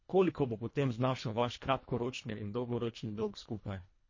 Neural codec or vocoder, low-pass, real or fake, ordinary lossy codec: codec, 24 kHz, 1.5 kbps, HILCodec; 7.2 kHz; fake; MP3, 32 kbps